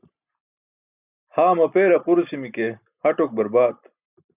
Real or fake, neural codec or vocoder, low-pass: real; none; 3.6 kHz